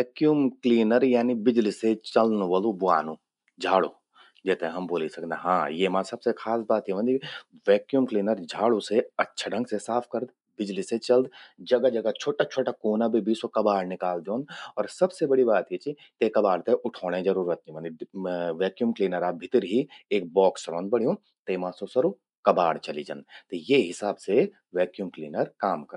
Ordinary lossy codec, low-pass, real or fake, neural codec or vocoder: none; 10.8 kHz; real; none